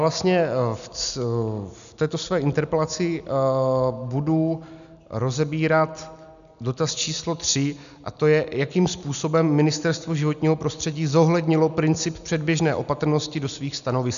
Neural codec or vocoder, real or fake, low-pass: none; real; 7.2 kHz